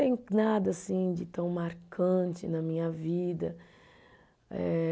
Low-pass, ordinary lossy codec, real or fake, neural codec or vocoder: none; none; real; none